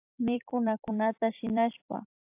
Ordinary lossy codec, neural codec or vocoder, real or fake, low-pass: AAC, 24 kbps; vocoder, 44.1 kHz, 128 mel bands every 256 samples, BigVGAN v2; fake; 3.6 kHz